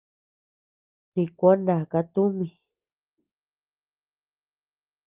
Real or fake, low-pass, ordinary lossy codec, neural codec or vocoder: real; 3.6 kHz; Opus, 24 kbps; none